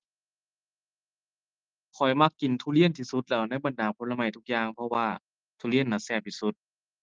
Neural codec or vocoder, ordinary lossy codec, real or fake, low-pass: none; Opus, 32 kbps; real; 7.2 kHz